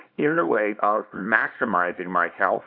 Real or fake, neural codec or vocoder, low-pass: fake; codec, 24 kHz, 0.9 kbps, WavTokenizer, small release; 5.4 kHz